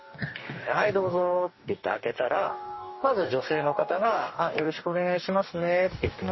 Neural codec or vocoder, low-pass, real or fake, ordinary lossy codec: codec, 44.1 kHz, 2.6 kbps, DAC; 7.2 kHz; fake; MP3, 24 kbps